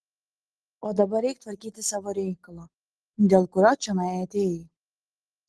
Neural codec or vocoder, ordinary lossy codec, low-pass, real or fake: none; Opus, 16 kbps; 10.8 kHz; real